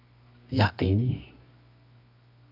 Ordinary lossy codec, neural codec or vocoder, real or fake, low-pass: AAC, 32 kbps; codec, 24 kHz, 0.9 kbps, WavTokenizer, medium music audio release; fake; 5.4 kHz